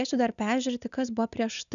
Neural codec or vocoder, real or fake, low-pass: none; real; 7.2 kHz